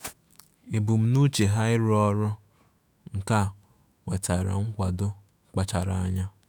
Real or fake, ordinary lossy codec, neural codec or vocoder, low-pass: fake; none; autoencoder, 48 kHz, 128 numbers a frame, DAC-VAE, trained on Japanese speech; none